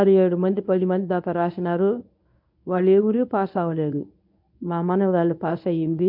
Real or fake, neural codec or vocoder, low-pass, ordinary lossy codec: fake; codec, 24 kHz, 0.9 kbps, WavTokenizer, medium speech release version 1; 5.4 kHz; MP3, 48 kbps